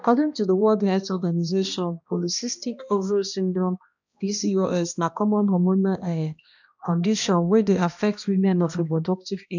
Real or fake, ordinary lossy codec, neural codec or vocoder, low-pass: fake; none; codec, 16 kHz, 1 kbps, X-Codec, HuBERT features, trained on balanced general audio; 7.2 kHz